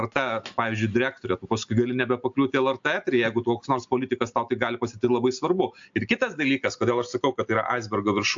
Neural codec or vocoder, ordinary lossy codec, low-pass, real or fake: none; AAC, 64 kbps; 7.2 kHz; real